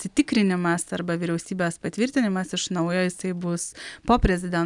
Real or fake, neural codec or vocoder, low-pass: real; none; 10.8 kHz